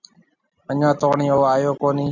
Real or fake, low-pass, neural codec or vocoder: real; 7.2 kHz; none